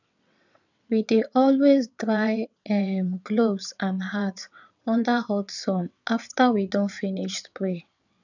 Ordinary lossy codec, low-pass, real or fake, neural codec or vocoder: none; 7.2 kHz; fake; vocoder, 22.05 kHz, 80 mel bands, WaveNeXt